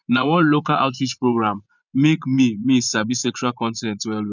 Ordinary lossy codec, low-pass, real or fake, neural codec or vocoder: none; 7.2 kHz; fake; vocoder, 24 kHz, 100 mel bands, Vocos